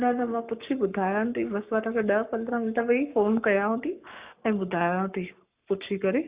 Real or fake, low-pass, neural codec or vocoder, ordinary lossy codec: fake; 3.6 kHz; codec, 44.1 kHz, 7.8 kbps, Pupu-Codec; Opus, 64 kbps